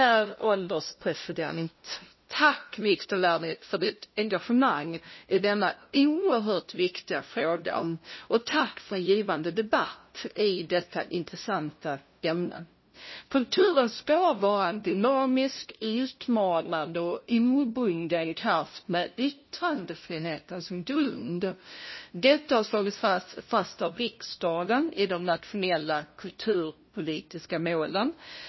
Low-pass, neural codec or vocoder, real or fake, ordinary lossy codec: 7.2 kHz; codec, 16 kHz, 0.5 kbps, FunCodec, trained on LibriTTS, 25 frames a second; fake; MP3, 24 kbps